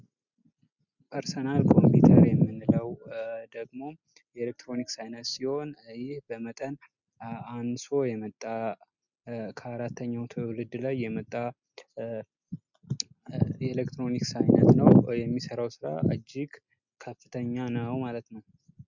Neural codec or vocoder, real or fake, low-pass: none; real; 7.2 kHz